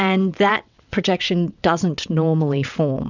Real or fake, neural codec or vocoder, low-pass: fake; vocoder, 22.05 kHz, 80 mel bands, WaveNeXt; 7.2 kHz